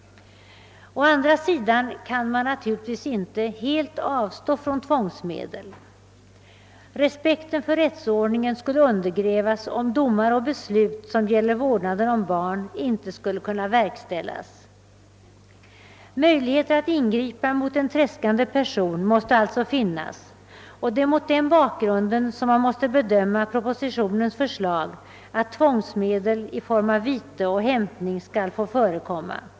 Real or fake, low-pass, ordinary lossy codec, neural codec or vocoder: real; none; none; none